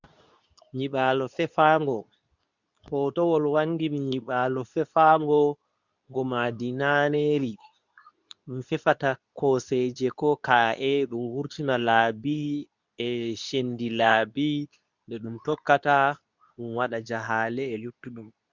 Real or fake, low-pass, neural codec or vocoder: fake; 7.2 kHz; codec, 24 kHz, 0.9 kbps, WavTokenizer, medium speech release version 2